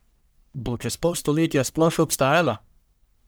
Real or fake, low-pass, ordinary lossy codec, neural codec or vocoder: fake; none; none; codec, 44.1 kHz, 1.7 kbps, Pupu-Codec